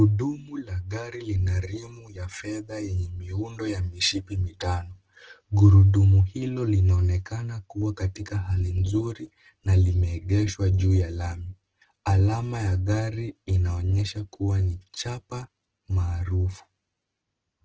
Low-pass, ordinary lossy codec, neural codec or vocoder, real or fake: 7.2 kHz; Opus, 16 kbps; none; real